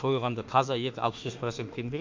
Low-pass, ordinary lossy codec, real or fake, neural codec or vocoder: 7.2 kHz; MP3, 48 kbps; fake; autoencoder, 48 kHz, 32 numbers a frame, DAC-VAE, trained on Japanese speech